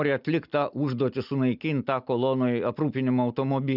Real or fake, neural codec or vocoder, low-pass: real; none; 5.4 kHz